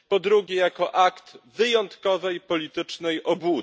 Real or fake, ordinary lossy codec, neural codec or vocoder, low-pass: real; none; none; none